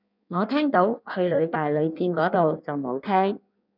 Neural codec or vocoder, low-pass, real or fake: codec, 16 kHz in and 24 kHz out, 1.1 kbps, FireRedTTS-2 codec; 5.4 kHz; fake